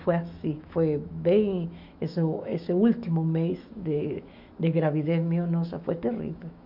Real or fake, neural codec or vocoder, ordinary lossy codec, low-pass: fake; autoencoder, 48 kHz, 128 numbers a frame, DAC-VAE, trained on Japanese speech; MP3, 48 kbps; 5.4 kHz